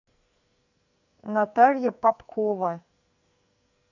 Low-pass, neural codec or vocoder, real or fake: 7.2 kHz; codec, 44.1 kHz, 2.6 kbps, SNAC; fake